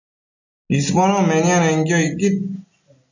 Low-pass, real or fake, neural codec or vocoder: 7.2 kHz; real; none